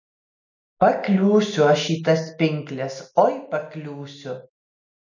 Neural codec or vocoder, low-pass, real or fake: none; 7.2 kHz; real